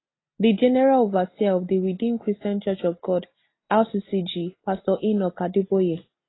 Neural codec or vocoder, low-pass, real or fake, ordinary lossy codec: none; 7.2 kHz; real; AAC, 16 kbps